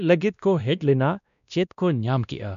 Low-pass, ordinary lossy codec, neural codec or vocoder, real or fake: 7.2 kHz; none; codec, 16 kHz, 1 kbps, X-Codec, WavLM features, trained on Multilingual LibriSpeech; fake